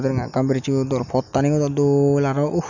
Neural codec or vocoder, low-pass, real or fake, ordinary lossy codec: none; 7.2 kHz; real; none